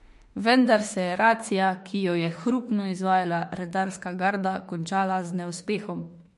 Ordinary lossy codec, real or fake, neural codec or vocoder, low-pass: MP3, 48 kbps; fake; autoencoder, 48 kHz, 32 numbers a frame, DAC-VAE, trained on Japanese speech; 14.4 kHz